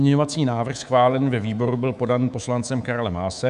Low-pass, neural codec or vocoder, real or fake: 10.8 kHz; codec, 24 kHz, 3.1 kbps, DualCodec; fake